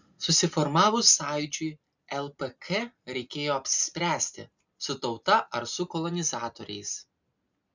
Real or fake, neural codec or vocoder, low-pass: real; none; 7.2 kHz